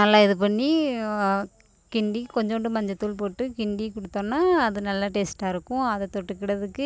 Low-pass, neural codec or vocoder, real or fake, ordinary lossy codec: none; none; real; none